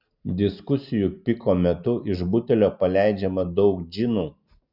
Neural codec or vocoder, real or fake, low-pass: none; real; 5.4 kHz